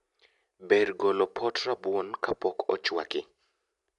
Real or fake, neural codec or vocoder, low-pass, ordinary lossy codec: real; none; 10.8 kHz; none